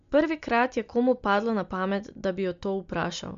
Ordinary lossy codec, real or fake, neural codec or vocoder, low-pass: MP3, 48 kbps; real; none; 7.2 kHz